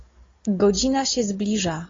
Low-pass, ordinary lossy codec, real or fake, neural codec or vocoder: 7.2 kHz; AAC, 32 kbps; real; none